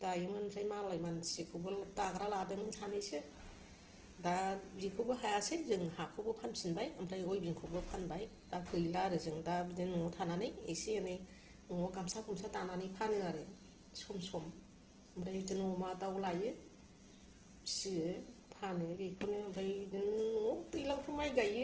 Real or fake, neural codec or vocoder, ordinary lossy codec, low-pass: real; none; Opus, 16 kbps; 7.2 kHz